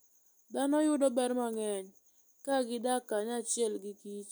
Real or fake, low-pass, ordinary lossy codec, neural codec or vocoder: real; none; none; none